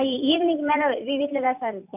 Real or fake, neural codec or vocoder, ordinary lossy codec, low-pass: fake; vocoder, 44.1 kHz, 128 mel bands every 256 samples, BigVGAN v2; AAC, 32 kbps; 3.6 kHz